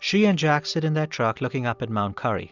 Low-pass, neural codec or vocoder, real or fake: 7.2 kHz; none; real